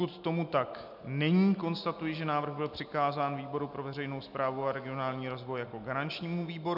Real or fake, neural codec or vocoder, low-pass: real; none; 5.4 kHz